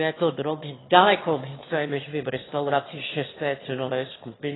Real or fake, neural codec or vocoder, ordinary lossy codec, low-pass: fake; autoencoder, 22.05 kHz, a latent of 192 numbers a frame, VITS, trained on one speaker; AAC, 16 kbps; 7.2 kHz